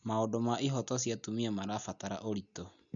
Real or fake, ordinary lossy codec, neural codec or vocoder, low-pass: real; none; none; 7.2 kHz